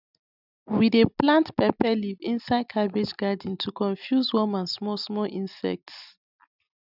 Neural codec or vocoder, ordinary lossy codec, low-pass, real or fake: none; none; 5.4 kHz; real